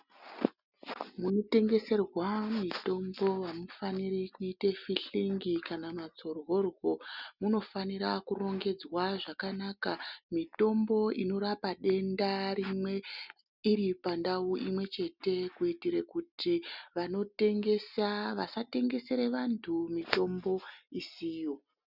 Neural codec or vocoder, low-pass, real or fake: none; 5.4 kHz; real